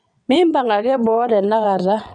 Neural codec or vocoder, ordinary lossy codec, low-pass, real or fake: vocoder, 22.05 kHz, 80 mel bands, WaveNeXt; none; 9.9 kHz; fake